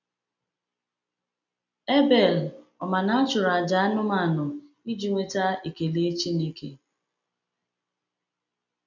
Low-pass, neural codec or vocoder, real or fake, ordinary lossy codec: 7.2 kHz; none; real; none